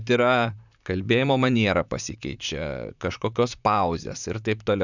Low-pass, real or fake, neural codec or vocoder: 7.2 kHz; fake; vocoder, 44.1 kHz, 128 mel bands, Pupu-Vocoder